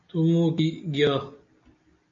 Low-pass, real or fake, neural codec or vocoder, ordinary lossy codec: 7.2 kHz; real; none; MP3, 48 kbps